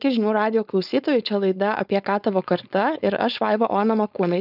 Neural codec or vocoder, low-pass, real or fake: codec, 16 kHz, 4.8 kbps, FACodec; 5.4 kHz; fake